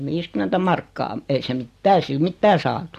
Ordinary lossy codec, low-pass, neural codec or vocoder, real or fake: AAC, 64 kbps; 14.4 kHz; none; real